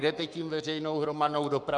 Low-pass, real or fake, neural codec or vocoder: 10.8 kHz; fake; codec, 44.1 kHz, 7.8 kbps, Pupu-Codec